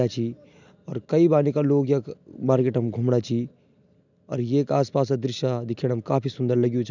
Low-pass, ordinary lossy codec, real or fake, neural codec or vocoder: 7.2 kHz; none; real; none